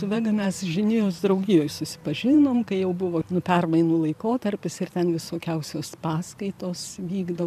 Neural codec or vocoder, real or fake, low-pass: vocoder, 44.1 kHz, 128 mel bands every 512 samples, BigVGAN v2; fake; 14.4 kHz